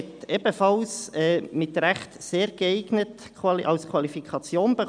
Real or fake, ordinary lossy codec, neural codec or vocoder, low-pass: real; none; none; 9.9 kHz